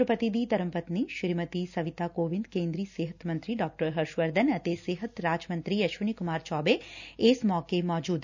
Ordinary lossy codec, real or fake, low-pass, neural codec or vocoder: none; real; 7.2 kHz; none